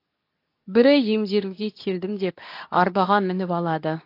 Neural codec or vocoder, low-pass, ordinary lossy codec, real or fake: codec, 24 kHz, 0.9 kbps, WavTokenizer, medium speech release version 2; 5.4 kHz; AAC, 32 kbps; fake